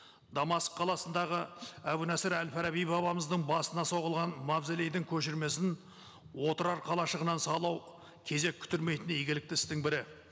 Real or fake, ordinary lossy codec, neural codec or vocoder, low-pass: real; none; none; none